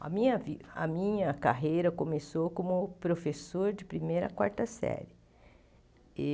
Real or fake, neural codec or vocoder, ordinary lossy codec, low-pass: real; none; none; none